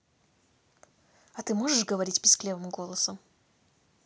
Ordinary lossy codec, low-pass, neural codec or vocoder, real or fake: none; none; none; real